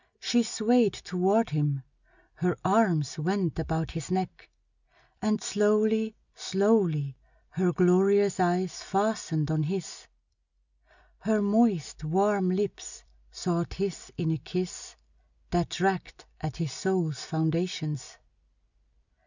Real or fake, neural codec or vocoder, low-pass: real; none; 7.2 kHz